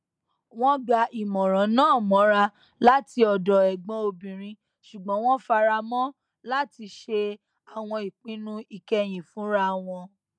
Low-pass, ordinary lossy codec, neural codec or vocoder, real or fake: 9.9 kHz; none; none; real